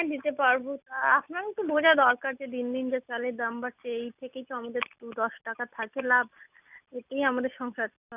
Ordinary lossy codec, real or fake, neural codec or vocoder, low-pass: none; real; none; 3.6 kHz